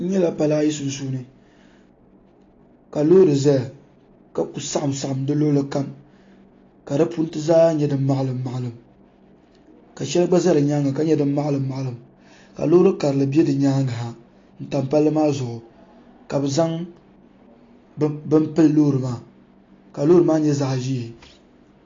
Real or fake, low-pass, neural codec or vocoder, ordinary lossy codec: real; 7.2 kHz; none; AAC, 32 kbps